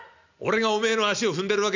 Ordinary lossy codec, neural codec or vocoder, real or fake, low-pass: none; none; real; 7.2 kHz